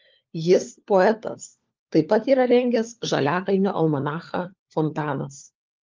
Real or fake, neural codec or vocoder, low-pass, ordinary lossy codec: fake; codec, 16 kHz, 4 kbps, FunCodec, trained on LibriTTS, 50 frames a second; 7.2 kHz; Opus, 32 kbps